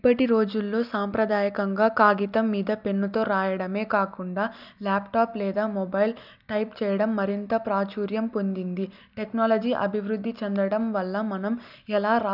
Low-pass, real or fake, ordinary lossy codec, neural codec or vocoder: 5.4 kHz; real; none; none